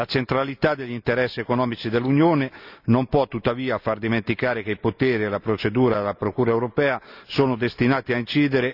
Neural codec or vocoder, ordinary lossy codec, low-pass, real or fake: none; none; 5.4 kHz; real